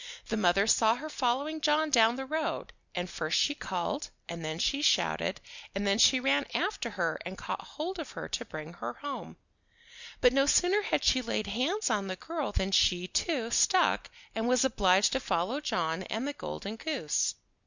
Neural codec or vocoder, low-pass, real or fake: none; 7.2 kHz; real